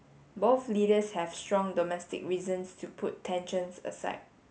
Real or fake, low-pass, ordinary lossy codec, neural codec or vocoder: real; none; none; none